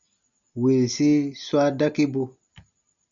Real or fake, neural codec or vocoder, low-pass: real; none; 7.2 kHz